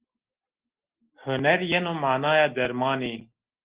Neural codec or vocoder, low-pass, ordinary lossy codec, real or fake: none; 3.6 kHz; Opus, 16 kbps; real